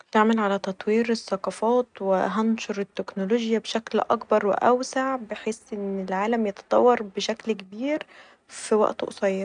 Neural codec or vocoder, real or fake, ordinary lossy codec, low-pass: none; real; none; 9.9 kHz